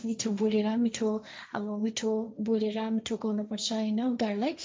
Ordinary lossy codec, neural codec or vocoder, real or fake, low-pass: none; codec, 16 kHz, 1.1 kbps, Voila-Tokenizer; fake; none